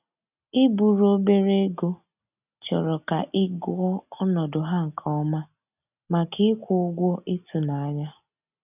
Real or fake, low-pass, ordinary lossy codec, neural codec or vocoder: real; 3.6 kHz; none; none